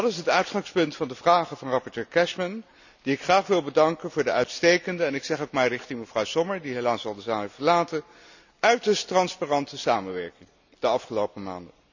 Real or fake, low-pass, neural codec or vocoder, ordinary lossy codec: real; 7.2 kHz; none; none